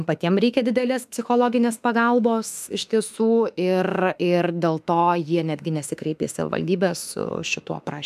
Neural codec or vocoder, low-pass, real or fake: autoencoder, 48 kHz, 32 numbers a frame, DAC-VAE, trained on Japanese speech; 14.4 kHz; fake